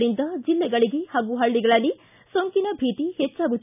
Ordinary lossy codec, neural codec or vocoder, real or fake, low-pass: none; none; real; 3.6 kHz